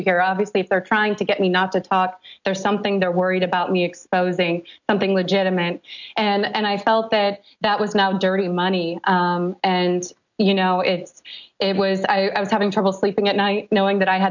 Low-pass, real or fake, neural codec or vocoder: 7.2 kHz; real; none